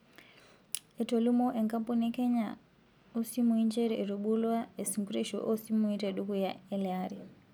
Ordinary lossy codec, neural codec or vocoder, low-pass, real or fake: none; none; none; real